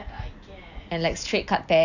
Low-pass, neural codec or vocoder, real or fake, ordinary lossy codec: 7.2 kHz; none; real; none